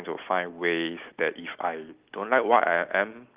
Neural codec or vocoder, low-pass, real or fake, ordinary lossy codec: none; 3.6 kHz; real; Opus, 32 kbps